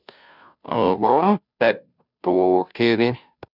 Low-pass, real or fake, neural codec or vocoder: 5.4 kHz; fake; codec, 16 kHz, 0.5 kbps, FunCodec, trained on Chinese and English, 25 frames a second